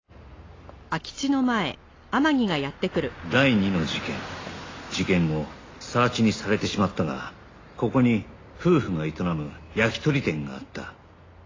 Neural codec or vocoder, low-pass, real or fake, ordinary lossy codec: none; 7.2 kHz; real; AAC, 32 kbps